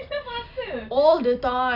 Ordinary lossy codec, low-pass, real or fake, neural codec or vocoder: none; 5.4 kHz; real; none